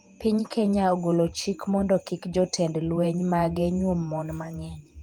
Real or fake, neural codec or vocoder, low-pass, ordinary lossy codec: fake; vocoder, 48 kHz, 128 mel bands, Vocos; 19.8 kHz; Opus, 32 kbps